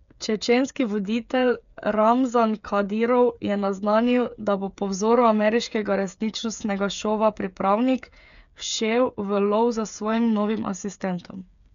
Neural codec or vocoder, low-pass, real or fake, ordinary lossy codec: codec, 16 kHz, 8 kbps, FreqCodec, smaller model; 7.2 kHz; fake; none